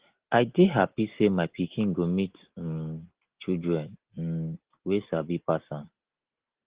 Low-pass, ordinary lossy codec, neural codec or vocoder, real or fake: 3.6 kHz; Opus, 16 kbps; none; real